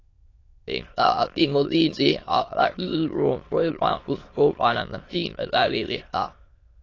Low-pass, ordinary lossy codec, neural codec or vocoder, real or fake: 7.2 kHz; AAC, 32 kbps; autoencoder, 22.05 kHz, a latent of 192 numbers a frame, VITS, trained on many speakers; fake